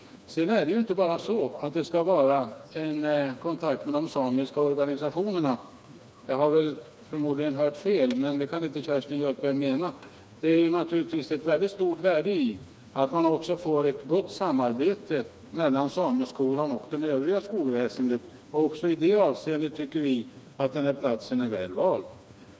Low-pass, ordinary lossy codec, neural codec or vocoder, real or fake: none; none; codec, 16 kHz, 2 kbps, FreqCodec, smaller model; fake